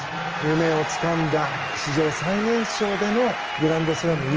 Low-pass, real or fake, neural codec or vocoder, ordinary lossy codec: 7.2 kHz; real; none; Opus, 24 kbps